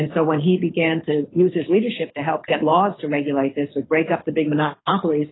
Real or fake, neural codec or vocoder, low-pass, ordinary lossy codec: fake; codec, 24 kHz, 6 kbps, HILCodec; 7.2 kHz; AAC, 16 kbps